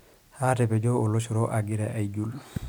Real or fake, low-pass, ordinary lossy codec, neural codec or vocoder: real; none; none; none